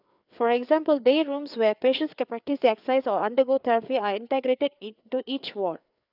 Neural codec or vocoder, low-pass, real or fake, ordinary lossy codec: codec, 16 kHz, 4 kbps, FreqCodec, larger model; 5.4 kHz; fake; none